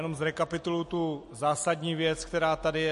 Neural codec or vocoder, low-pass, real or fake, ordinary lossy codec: none; 14.4 kHz; real; MP3, 48 kbps